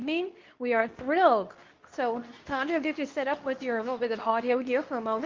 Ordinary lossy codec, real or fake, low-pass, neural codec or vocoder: Opus, 24 kbps; fake; 7.2 kHz; codec, 24 kHz, 0.9 kbps, WavTokenizer, medium speech release version 2